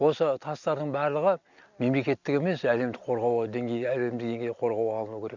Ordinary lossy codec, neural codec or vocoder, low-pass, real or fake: none; none; 7.2 kHz; real